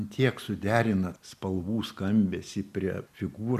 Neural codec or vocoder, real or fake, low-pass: none; real; 14.4 kHz